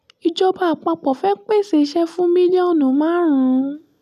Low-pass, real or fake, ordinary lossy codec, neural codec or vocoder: 14.4 kHz; real; none; none